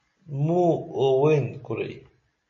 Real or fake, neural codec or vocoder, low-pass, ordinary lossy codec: real; none; 7.2 kHz; MP3, 32 kbps